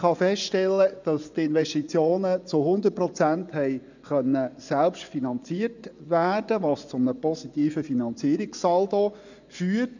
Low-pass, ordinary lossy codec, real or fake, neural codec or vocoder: 7.2 kHz; none; fake; vocoder, 24 kHz, 100 mel bands, Vocos